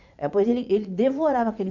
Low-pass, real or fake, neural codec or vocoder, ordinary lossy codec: 7.2 kHz; fake; vocoder, 44.1 kHz, 80 mel bands, Vocos; none